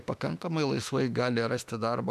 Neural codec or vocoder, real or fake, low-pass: autoencoder, 48 kHz, 32 numbers a frame, DAC-VAE, trained on Japanese speech; fake; 14.4 kHz